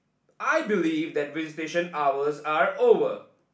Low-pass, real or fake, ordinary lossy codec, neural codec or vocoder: none; real; none; none